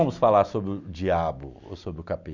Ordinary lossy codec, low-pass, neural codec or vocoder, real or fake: none; 7.2 kHz; none; real